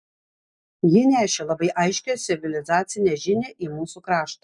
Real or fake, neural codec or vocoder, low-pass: real; none; 10.8 kHz